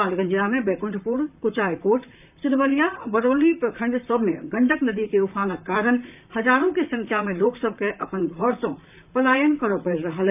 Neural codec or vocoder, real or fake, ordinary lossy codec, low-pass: vocoder, 44.1 kHz, 128 mel bands, Pupu-Vocoder; fake; none; 3.6 kHz